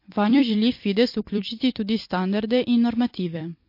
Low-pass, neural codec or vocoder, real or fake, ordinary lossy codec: 5.4 kHz; vocoder, 44.1 kHz, 128 mel bands every 256 samples, BigVGAN v2; fake; MP3, 32 kbps